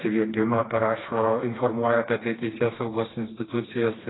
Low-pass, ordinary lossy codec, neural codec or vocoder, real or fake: 7.2 kHz; AAC, 16 kbps; codec, 16 kHz, 2 kbps, FreqCodec, smaller model; fake